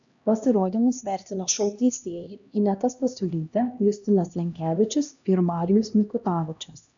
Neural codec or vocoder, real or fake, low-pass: codec, 16 kHz, 1 kbps, X-Codec, HuBERT features, trained on LibriSpeech; fake; 7.2 kHz